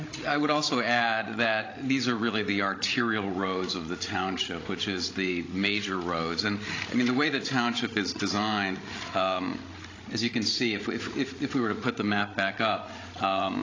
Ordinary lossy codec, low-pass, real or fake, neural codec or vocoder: AAC, 32 kbps; 7.2 kHz; fake; codec, 16 kHz, 16 kbps, FreqCodec, larger model